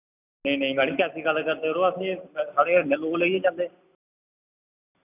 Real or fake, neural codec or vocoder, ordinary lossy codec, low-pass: real; none; none; 3.6 kHz